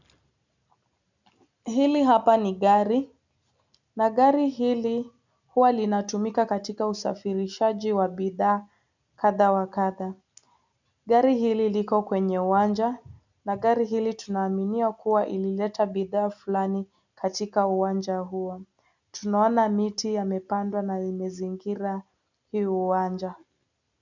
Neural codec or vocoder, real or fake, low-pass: none; real; 7.2 kHz